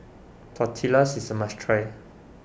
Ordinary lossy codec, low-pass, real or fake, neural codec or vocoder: none; none; real; none